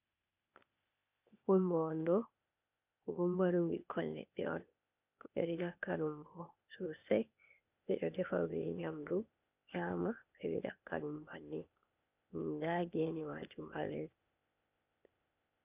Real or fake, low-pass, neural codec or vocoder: fake; 3.6 kHz; codec, 16 kHz, 0.8 kbps, ZipCodec